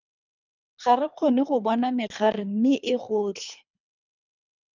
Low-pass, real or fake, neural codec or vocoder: 7.2 kHz; fake; codec, 24 kHz, 3 kbps, HILCodec